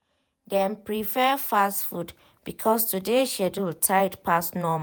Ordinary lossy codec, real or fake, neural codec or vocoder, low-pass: none; fake; vocoder, 48 kHz, 128 mel bands, Vocos; none